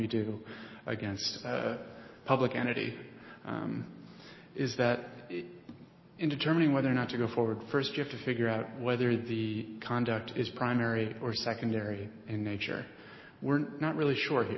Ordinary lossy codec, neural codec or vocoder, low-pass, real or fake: MP3, 24 kbps; none; 7.2 kHz; real